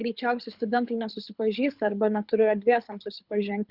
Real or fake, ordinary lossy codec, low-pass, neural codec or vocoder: fake; Opus, 64 kbps; 5.4 kHz; codec, 24 kHz, 6 kbps, HILCodec